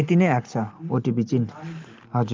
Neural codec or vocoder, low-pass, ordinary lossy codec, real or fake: none; 7.2 kHz; Opus, 24 kbps; real